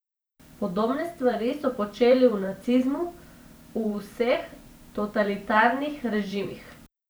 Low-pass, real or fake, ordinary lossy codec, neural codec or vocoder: none; fake; none; vocoder, 44.1 kHz, 128 mel bands every 512 samples, BigVGAN v2